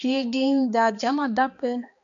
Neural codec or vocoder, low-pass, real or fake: codec, 16 kHz, 2 kbps, X-Codec, HuBERT features, trained on balanced general audio; 7.2 kHz; fake